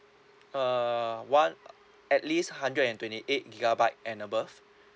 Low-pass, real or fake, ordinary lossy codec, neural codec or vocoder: none; real; none; none